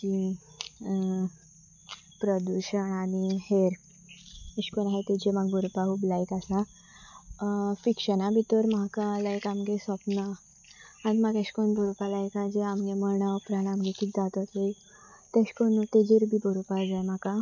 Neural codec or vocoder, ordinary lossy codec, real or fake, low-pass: none; none; real; 7.2 kHz